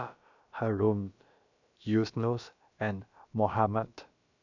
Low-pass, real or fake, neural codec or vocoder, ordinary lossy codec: 7.2 kHz; fake; codec, 16 kHz, about 1 kbps, DyCAST, with the encoder's durations; none